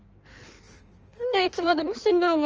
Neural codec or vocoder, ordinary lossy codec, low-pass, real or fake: codec, 16 kHz in and 24 kHz out, 1.1 kbps, FireRedTTS-2 codec; Opus, 24 kbps; 7.2 kHz; fake